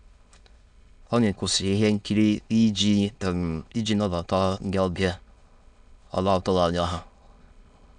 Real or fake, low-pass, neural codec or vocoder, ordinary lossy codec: fake; 9.9 kHz; autoencoder, 22.05 kHz, a latent of 192 numbers a frame, VITS, trained on many speakers; none